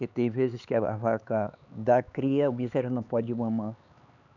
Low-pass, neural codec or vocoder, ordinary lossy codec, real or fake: 7.2 kHz; codec, 16 kHz, 4 kbps, X-Codec, HuBERT features, trained on LibriSpeech; none; fake